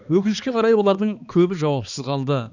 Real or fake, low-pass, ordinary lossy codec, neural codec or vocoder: fake; 7.2 kHz; none; codec, 16 kHz, 2 kbps, X-Codec, HuBERT features, trained on balanced general audio